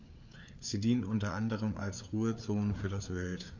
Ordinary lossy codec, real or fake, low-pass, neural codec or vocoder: none; fake; 7.2 kHz; codec, 16 kHz, 4 kbps, FreqCodec, larger model